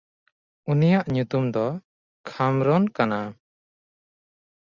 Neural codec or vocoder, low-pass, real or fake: none; 7.2 kHz; real